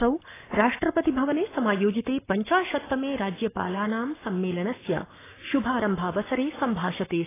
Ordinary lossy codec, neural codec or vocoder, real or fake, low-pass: AAC, 16 kbps; codec, 44.1 kHz, 7.8 kbps, Pupu-Codec; fake; 3.6 kHz